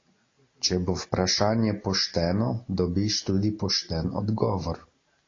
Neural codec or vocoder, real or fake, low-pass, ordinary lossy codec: none; real; 7.2 kHz; AAC, 32 kbps